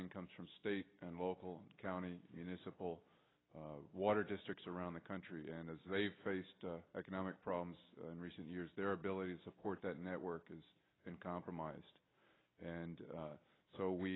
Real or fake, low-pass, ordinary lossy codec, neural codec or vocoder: real; 7.2 kHz; AAC, 16 kbps; none